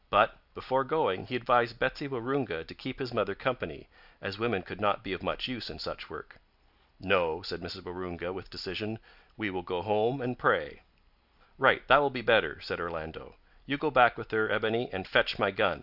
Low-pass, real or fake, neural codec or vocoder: 5.4 kHz; real; none